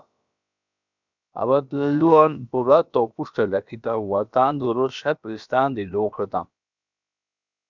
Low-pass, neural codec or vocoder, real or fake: 7.2 kHz; codec, 16 kHz, about 1 kbps, DyCAST, with the encoder's durations; fake